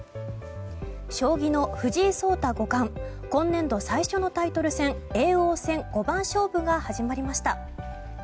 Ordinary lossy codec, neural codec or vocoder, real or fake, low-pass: none; none; real; none